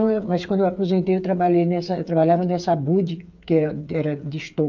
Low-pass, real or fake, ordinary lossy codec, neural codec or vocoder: 7.2 kHz; fake; none; codec, 16 kHz, 8 kbps, FreqCodec, smaller model